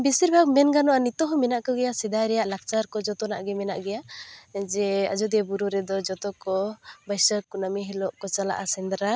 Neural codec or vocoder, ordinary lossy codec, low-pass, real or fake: none; none; none; real